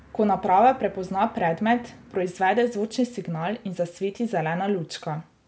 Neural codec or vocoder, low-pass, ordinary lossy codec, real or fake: none; none; none; real